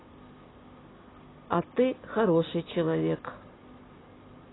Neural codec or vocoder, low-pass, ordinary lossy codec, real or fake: none; 7.2 kHz; AAC, 16 kbps; real